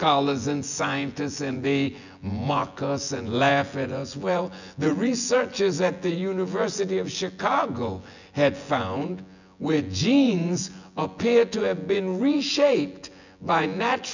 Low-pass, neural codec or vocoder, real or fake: 7.2 kHz; vocoder, 24 kHz, 100 mel bands, Vocos; fake